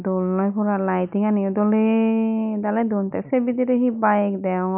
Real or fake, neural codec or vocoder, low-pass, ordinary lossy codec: real; none; 3.6 kHz; none